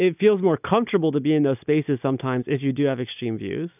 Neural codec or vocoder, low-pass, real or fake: none; 3.6 kHz; real